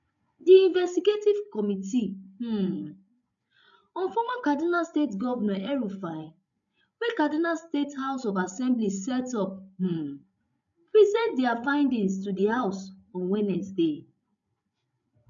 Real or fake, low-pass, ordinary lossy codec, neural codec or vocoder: fake; 7.2 kHz; none; codec, 16 kHz, 16 kbps, FreqCodec, larger model